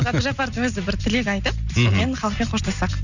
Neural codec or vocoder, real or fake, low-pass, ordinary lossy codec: none; real; 7.2 kHz; none